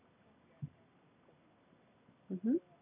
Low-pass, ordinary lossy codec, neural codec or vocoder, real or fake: 3.6 kHz; none; none; real